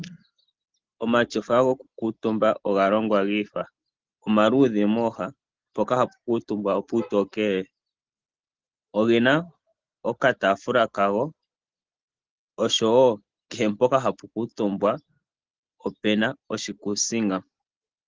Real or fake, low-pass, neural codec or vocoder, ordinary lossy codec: real; 7.2 kHz; none; Opus, 16 kbps